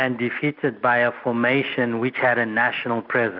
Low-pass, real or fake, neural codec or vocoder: 5.4 kHz; real; none